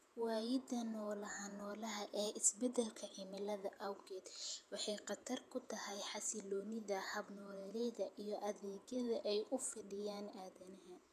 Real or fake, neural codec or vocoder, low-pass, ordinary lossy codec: fake; vocoder, 48 kHz, 128 mel bands, Vocos; 14.4 kHz; none